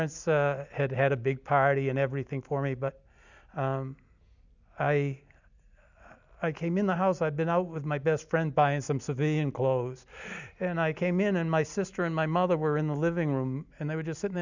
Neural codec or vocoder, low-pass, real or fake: none; 7.2 kHz; real